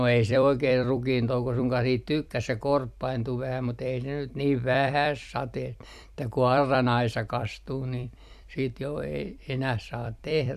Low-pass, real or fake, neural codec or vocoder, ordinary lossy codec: 14.4 kHz; fake; vocoder, 44.1 kHz, 128 mel bands every 256 samples, BigVGAN v2; none